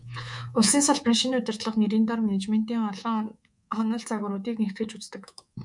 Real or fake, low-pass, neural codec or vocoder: fake; 10.8 kHz; codec, 24 kHz, 3.1 kbps, DualCodec